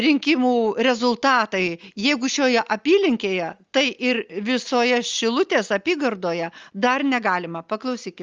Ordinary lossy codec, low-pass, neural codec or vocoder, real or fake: Opus, 32 kbps; 7.2 kHz; none; real